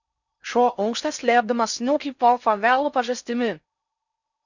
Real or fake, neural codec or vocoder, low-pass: fake; codec, 16 kHz in and 24 kHz out, 0.6 kbps, FocalCodec, streaming, 4096 codes; 7.2 kHz